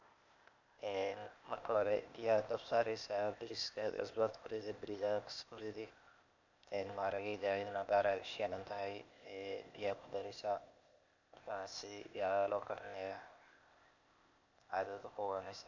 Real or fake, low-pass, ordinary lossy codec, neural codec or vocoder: fake; 7.2 kHz; none; codec, 16 kHz, 0.8 kbps, ZipCodec